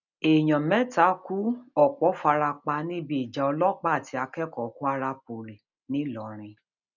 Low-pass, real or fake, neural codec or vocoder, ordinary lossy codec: 7.2 kHz; real; none; none